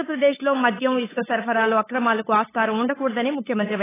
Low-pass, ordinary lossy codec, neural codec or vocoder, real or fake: 3.6 kHz; AAC, 16 kbps; codec, 16 kHz, 8 kbps, FunCodec, trained on LibriTTS, 25 frames a second; fake